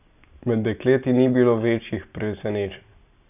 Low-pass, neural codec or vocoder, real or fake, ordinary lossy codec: 3.6 kHz; none; real; none